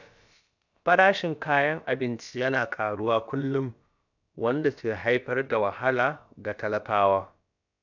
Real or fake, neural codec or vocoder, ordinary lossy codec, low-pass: fake; codec, 16 kHz, about 1 kbps, DyCAST, with the encoder's durations; none; 7.2 kHz